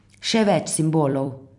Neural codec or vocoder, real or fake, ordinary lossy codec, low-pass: vocoder, 44.1 kHz, 128 mel bands every 256 samples, BigVGAN v2; fake; none; 10.8 kHz